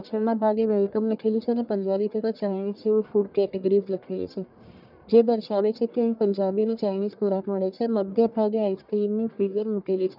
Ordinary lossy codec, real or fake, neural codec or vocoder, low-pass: none; fake; codec, 44.1 kHz, 1.7 kbps, Pupu-Codec; 5.4 kHz